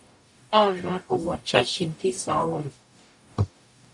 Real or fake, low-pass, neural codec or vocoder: fake; 10.8 kHz; codec, 44.1 kHz, 0.9 kbps, DAC